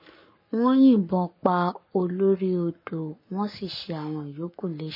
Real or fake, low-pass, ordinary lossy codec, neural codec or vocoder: fake; 5.4 kHz; MP3, 24 kbps; codec, 44.1 kHz, 7.8 kbps, Pupu-Codec